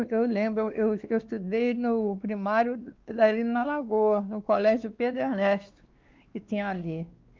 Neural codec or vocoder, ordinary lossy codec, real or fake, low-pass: codec, 16 kHz, 2 kbps, X-Codec, WavLM features, trained on Multilingual LibriSpeech; Opus, 32 kbps; fake; 7.2 kHz